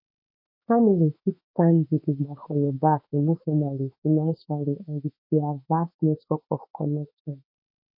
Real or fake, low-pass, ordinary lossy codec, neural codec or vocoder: fake; 5.4 kHz; MP3, 32 kbps; autoencoder, 48 kHz, 32 numbers a frame, DAC-VAE, trained on Japanese speech